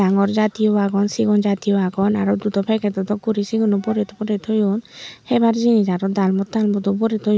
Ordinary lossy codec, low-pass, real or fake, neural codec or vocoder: none; none; real; none